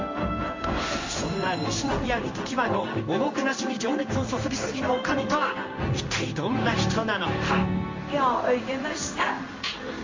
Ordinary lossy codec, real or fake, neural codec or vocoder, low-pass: MP3, 48 kbps; fake; codec, 16 kHz in and 24 kHz out, 1 kbps, XY-Tokenizer; 7.2 kHz